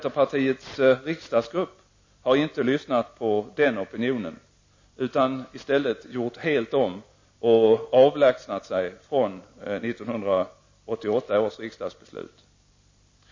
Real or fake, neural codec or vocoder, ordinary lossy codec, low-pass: real; none; MP3, 32 kbps; 7.2 kHz